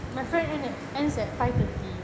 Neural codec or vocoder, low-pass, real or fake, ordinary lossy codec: none; none; real; none